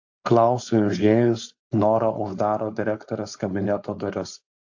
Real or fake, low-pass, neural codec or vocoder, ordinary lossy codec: fake; 7.2 kHz; codec, 16 kHz, 4.8 kbps, FACodec; AAC, 48 kbps